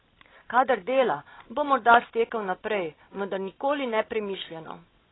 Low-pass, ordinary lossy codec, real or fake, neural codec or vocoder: 7.2 kHz; AAC, 16 kbps; real; none